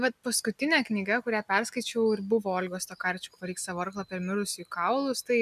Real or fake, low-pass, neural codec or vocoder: real; 14.4 kHz; none